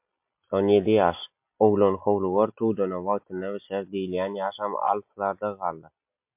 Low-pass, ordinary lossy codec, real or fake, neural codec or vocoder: 3.6 kHz; MP3, 32 kbps; real; none